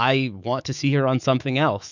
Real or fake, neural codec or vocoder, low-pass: fake; autoencoder, 48 kHz, 128 numbers a frame, DAC-VAE, trained on Japanese speech; 7.2 kHz